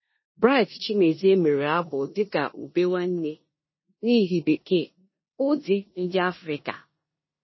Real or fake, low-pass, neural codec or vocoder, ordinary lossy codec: fake; 7.2 kHz; codec, 16 kHz in and 24 kHz out, 0.9 kbps, LongCat-Audio-Codec, four codebook decoder; MP3, 24 kbps